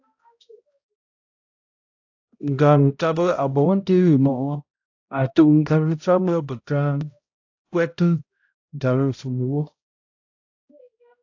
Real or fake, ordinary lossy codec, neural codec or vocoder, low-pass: fake; AAC, 48 kbps; codec, 16 kHz, 0.5 kbps, X-Codec, HuBERT features, trained on balanced general audio; 7.2 kHz